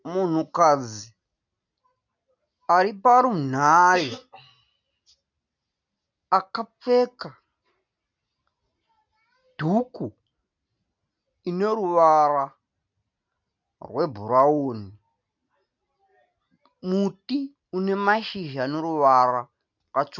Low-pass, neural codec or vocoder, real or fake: 7.2 kHz; none; real